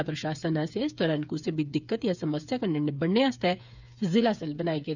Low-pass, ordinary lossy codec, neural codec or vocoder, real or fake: 7.2 kHz; none; codec, 16 kHz, 8 kbps, FreqCodec, smaller model; fake